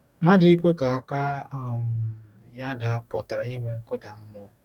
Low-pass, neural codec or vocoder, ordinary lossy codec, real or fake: 19.8 kHz; codec, 44.1 kHz, 2.6 kbps, DAC; none; fake